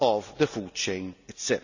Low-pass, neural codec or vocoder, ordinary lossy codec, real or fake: 7.2 kHz; none; none; real